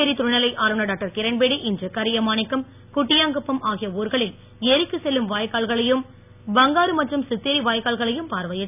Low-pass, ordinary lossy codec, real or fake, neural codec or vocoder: 3.6 kHz; none; real; none